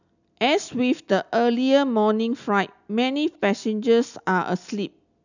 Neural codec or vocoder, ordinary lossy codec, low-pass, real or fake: none; none; 7.2 kHz; real